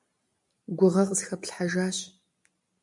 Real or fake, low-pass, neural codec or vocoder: real; 10.8 kHz; none